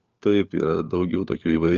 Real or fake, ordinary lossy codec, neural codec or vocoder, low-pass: fake; Opus, 24 kbps; codec, 16 kHz, 4 kbps, FunCodec, trained on LibriTTS, 50 frames a second; 7.2 kHz